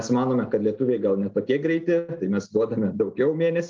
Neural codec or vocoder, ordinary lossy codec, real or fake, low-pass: none; Opus, 32 kbps; real; 7.2 kHz